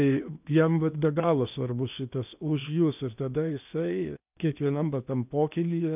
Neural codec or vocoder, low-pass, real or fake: codec, 16 kHz in and 24 kHz out, 0.8 kbps, FocalCodec, streaming, 65536 codes; 3.6 kHz; fake